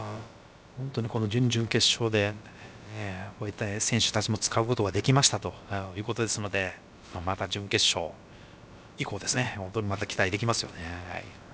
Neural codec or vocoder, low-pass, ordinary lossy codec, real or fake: codec, 16 kHz, about 1 kbps, DyCAST, with the encoder's durations; none; none; fake